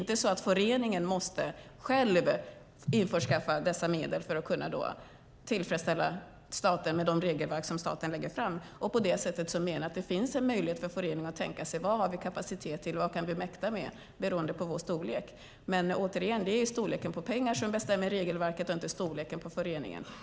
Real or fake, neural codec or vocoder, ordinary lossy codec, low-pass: real; none; none; none